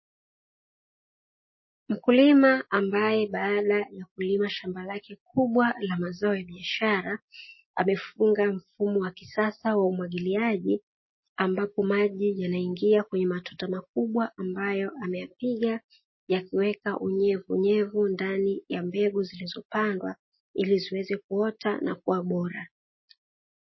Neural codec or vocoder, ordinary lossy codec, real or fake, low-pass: none; MP3, 24 kbps; real; 7.2 kHz